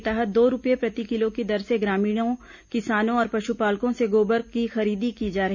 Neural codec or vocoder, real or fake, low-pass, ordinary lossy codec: none; real; 7.2 kHz; none